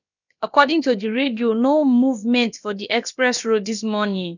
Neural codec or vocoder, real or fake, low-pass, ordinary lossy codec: codec, 16 kHz, about 1 kbps, DyCAST, with the encoder's durations; fake; 7.2 kHz; none